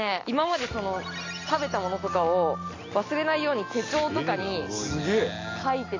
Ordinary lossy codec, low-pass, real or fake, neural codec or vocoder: AAC, 32 kbps; 7.2 kHz; real; none